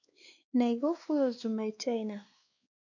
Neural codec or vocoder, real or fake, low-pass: codec, 16 kHz, 2 kbps, X-Codec, WavLM features, trained on Multilingual LibriSpeech; fake; 7.2 kHz